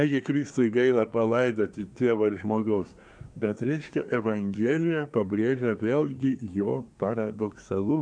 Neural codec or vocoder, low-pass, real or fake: codec, 24 kHz, 1 kbps, SNAC; 9.9 kHz; fake